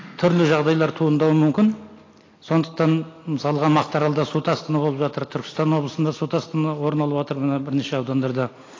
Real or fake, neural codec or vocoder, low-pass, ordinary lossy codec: real; none; 7.2 kHz; AAC, 32 kbps